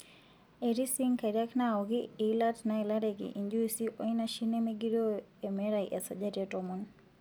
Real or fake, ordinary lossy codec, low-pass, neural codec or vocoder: real; none; none; none